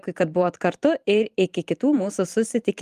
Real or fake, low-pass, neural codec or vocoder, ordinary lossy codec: fake; 14.4 kHz; vocoder, 44.1 kHz, 128 mel bands every 512 samples, BigVGAN v2; Opus, 32 kbps